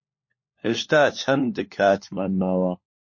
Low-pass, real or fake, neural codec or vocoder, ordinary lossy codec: 7.2 kHz; fake; codec, 16 kHz, 4 kbps, FunCodec, trained on LibriTTS, 50 frames a second; MP3, 32 kbps